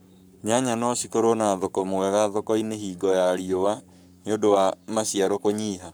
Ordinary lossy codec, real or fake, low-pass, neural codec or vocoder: none; fake; none; codec, 44.1 kHz, 7.8 kbps, Pupu-Codec